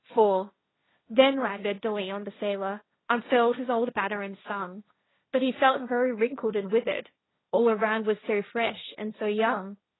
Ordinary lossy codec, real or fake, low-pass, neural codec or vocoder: AAC, 16 kbps; fake; 7.2 kHz; codec, 16 kHz, 1.1 kbps, Voila-Tokenizer